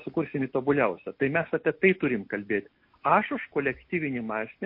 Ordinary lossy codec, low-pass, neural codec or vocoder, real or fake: MP3, 32 kbps; 5.4 kHz; none; real